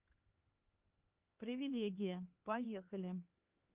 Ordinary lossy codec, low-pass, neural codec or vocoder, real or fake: Opus, 32 kbps; 3.6 kHz; codec, 16 kHz, 2 kbps, X-Codec, HuBERT features, trained on LibriSpeech; fake